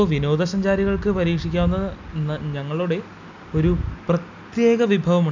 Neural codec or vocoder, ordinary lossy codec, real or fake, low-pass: none; none; real; 7.2 kHz